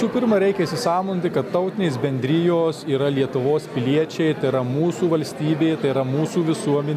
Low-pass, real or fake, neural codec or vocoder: 14.4 kHz; real; none